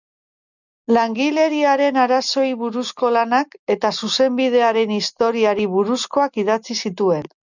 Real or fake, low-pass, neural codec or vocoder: real; 7.2 kHz; none